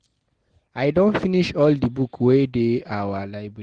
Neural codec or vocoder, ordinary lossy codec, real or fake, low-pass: none; Opus, 16 kbps; real; 9.9 kHz